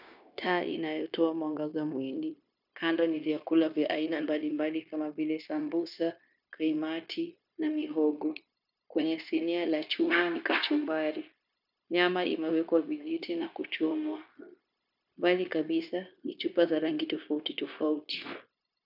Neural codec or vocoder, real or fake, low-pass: codec, 16 kHz, 0.9 kbps, LongCat-Audio-Codec; fake; 5.4 kHz